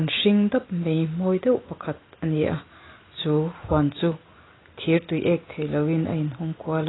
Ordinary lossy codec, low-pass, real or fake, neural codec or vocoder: AAC, 16 kbps; 7.2 kHz; real; none